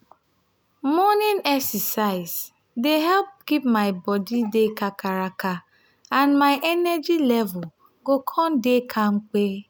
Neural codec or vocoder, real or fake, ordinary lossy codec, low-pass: none; real; none; none